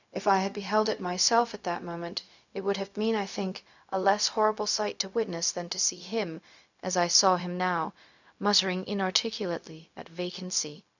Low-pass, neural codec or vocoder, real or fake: 7.2 kHz; codec, 16 kHz, 0.4 kbps, LongCat-Audio-Codec; fake